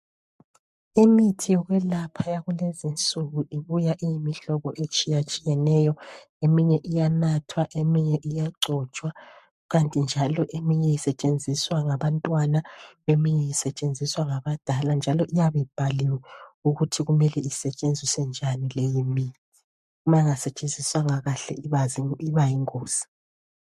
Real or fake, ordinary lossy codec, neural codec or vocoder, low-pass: fake; MP3, 64 kbps; codec, 44.1 kHz, 7.8 kbps, Pupu-Codec; 14.4 kHz